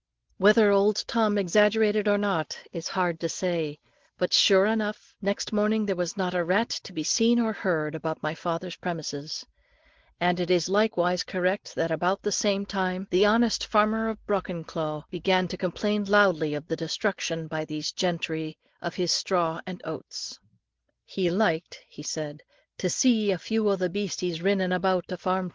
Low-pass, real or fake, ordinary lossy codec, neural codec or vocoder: 7.2 kHz; real; Opus, 16 kbps; none